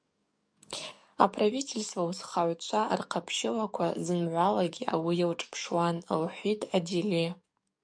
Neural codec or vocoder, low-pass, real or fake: codec, 44.1 kHz, 7.8 kbps, DAC; 9.9 kHz; fake